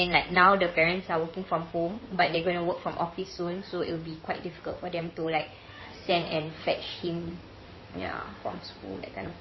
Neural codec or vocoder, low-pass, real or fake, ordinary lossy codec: codec, 16 kHz in and 24 kHz out, 2.2 kbps, FireRedTTS-2 codec; 7.2 kHz; fake; MP3, 24 kbps